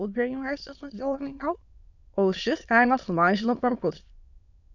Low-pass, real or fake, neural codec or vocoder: 7.2 kHz; fake; autoencoder, 22.05 kHz, a latent of 192 numbers a frame, VITS, trained on many speakers